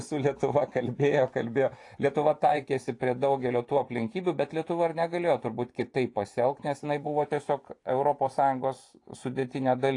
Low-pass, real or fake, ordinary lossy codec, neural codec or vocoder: 10.8 kHz; fake; AAC, 48 kbps; vocoder, 24 kHz, 100 mel bands, Vocos